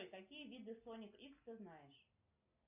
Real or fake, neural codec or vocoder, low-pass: real; none; 3.6 kHz